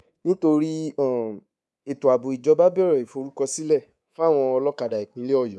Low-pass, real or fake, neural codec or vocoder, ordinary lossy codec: none; fake; codec, 24 kHz, 3.1 kbps, DualCodec; none